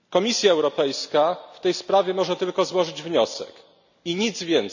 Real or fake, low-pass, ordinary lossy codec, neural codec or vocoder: real; 7.2 kHz; none; none